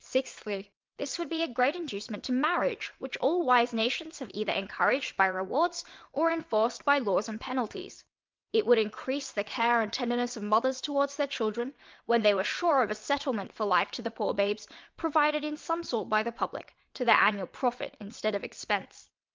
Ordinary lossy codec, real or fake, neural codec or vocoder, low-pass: Opus, 24 kbps; fake; vocoder, 22.05 kHz, 80 mel bands, WaveNeXt; 7.2 kHz